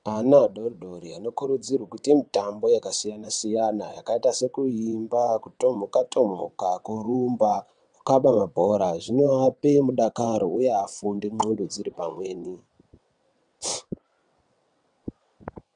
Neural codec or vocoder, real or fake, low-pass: vocoder, 22.05 kHz, 80 mel bands, WaveNeXt; fake; 9.9 kHz